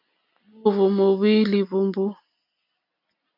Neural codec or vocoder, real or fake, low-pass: none; real; 5.4 kHz